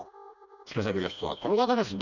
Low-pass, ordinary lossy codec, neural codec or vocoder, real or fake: 7.2 kHz; none; codec, 16 kHz, 1 kbps, FreqCodec, smaller model; fake